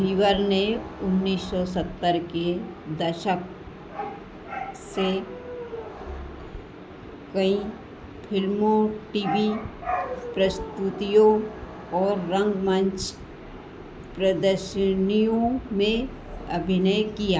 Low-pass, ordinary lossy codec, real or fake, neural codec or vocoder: none; none; real; none